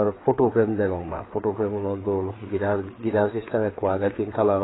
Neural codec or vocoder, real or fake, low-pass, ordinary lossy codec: codec, 16 kHz, 4 kbps, FreqCodec, larger model; fake; 7.2 kHz; AAC, 16 kbps